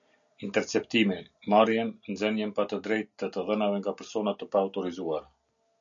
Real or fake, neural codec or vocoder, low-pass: real; none; 7.2 kHz